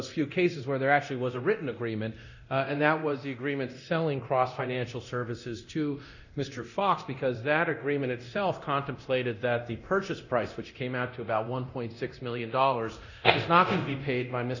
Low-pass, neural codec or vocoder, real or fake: 7.2 kHz; codec, 24 kHz, 0.9 kbps, DualCodec; fake